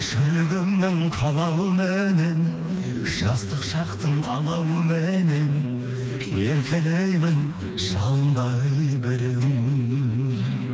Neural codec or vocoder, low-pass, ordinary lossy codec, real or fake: codec, 16 kHz, 2 kbps, FreqCodec, smaller model; none; none; fake